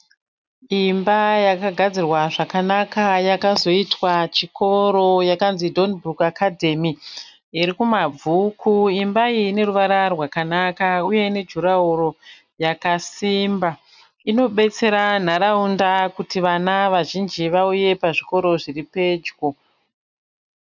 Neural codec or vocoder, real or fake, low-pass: none; real; 7.2 kHz